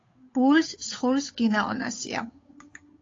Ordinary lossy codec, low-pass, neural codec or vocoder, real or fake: AAC, 32 kbps; 7.2 kHz; codec, 16 kHz, 8 kbps, FunCodec, trained on Chinese and English, 25 frames a second; fake